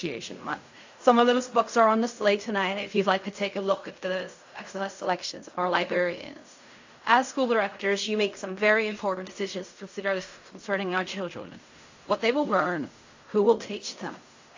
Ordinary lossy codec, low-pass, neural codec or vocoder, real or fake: AAC, 48 kbps; 7.2 kHz; codec, 16 kHz in and 24 kHz out, 0.4 kbps, LongCat-Audio-Codec, fine tuned four codebook decoder; fake